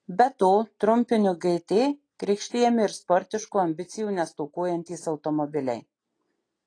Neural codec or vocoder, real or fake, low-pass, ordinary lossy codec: none; real; 9.9 kHz; AAC, 32 kbps